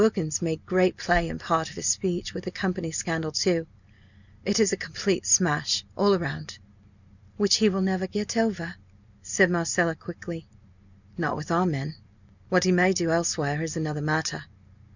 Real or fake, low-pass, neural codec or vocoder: real; 7.2 kHz; none